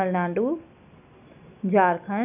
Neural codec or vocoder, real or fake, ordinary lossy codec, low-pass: none; real; none; 3.6 kHz